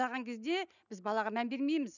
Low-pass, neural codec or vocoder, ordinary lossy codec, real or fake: 7.2 kHz; none; none; real